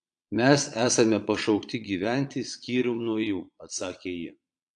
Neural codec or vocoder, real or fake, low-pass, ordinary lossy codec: vocoder, 22.05 kHz, 80 mel bands, Vocos; fake; 9.9 kHz; AAC, 64 kbps